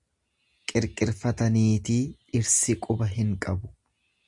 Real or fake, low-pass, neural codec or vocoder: real; 10.8 kHz; none